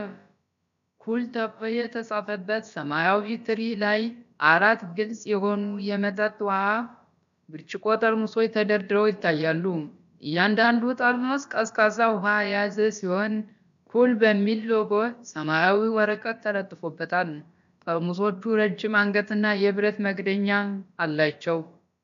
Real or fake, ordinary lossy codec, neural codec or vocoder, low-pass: fake; AAC, 96 kbps; codec, 16 kHz, about 1 kbps, DyCAST, with the encoder's durations; 7.2 kHz